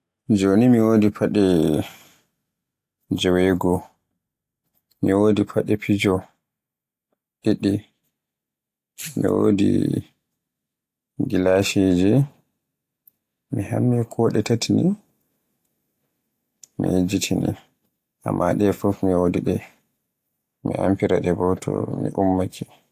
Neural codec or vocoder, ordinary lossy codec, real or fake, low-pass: none; AAC, 64 kbps; real; 14.4 kHz